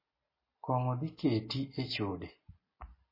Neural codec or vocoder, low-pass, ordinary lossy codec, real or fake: none; 5.4 kHz; MP3, 24 kbps; real